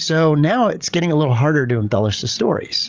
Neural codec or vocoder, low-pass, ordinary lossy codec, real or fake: codec, 16 kHz, 16 kbps, FreqCodec, larger model; 7.2 kHz; Opus, 32 kbps; fake